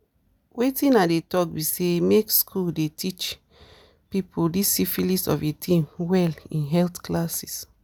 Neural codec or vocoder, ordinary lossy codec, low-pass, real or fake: none; none; none; real